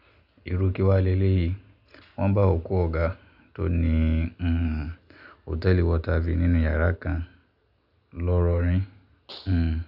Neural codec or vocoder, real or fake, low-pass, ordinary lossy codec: none; real; 5.4 kHz; none